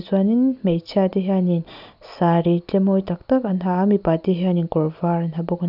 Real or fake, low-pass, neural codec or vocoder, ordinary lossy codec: real; 5.4 kHz; none; none